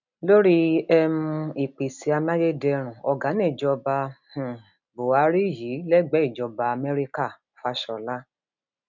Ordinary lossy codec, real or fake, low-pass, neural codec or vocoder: none; real; 7.2 kHz; none